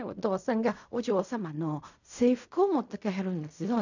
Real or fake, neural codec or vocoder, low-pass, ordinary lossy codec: fake; codec, 16 kHz in and 24 kHz out, 0.4 kbps, LongCat-Audio-Codec, fine tuned four codebook decoder; 7.2 kHz; none